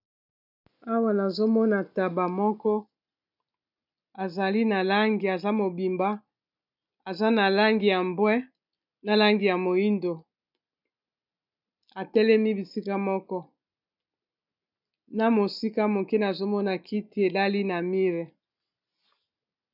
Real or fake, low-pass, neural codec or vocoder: real; 5.4 kHz; none